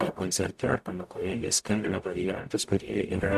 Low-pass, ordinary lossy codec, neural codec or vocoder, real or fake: 14.4 kHz; AAC, 96 kbps; codec, 44.1 kHz, 0.9 kbps, DAC; fake